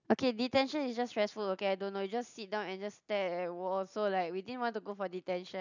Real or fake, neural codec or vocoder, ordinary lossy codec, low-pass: real; none; none; 7.2 kHz